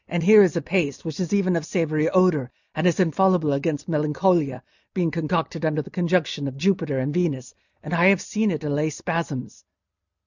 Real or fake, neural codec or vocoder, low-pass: real; none; 7.2 kHz